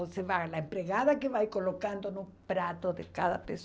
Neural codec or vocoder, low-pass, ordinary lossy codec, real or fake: none; none; none; real